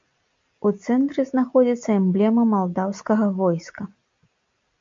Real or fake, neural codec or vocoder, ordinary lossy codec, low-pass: real; none; MP3, 48 kbps; 7.2 kHz